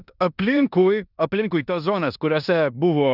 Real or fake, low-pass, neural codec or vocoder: fake; 5.4 kHz; codec, 16 kHz in and 24 kHz out, 0.9 kbps, LongCat-Audio-Codec, four codebook decoder